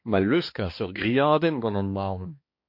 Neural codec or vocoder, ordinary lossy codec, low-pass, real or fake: codec, 16 kHz, 2 kbps, X-Codec, HuBERT features, trained on balanced general audio; MP3, 32 kbps; 5.4 kHz; fake